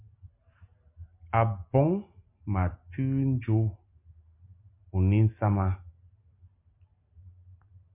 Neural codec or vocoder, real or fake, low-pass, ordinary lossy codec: none; real; 3.6 kHz; MP3, 32 kbps